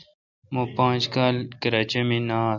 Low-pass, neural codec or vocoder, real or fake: 7.2 kHz; none; real